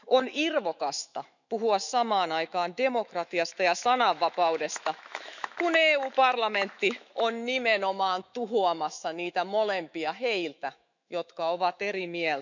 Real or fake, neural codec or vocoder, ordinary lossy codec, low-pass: fake; autoencoder, 48 kHz, 128 numbers a frame, DAC-VAE, trained on Japanese speech; none; 7.2 kHz